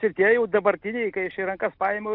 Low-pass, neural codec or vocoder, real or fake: 5.4 kHz; none; real